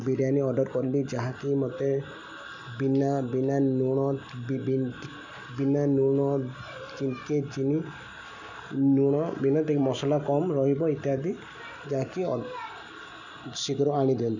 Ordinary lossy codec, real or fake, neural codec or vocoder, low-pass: none; real; none; 7.2 kHz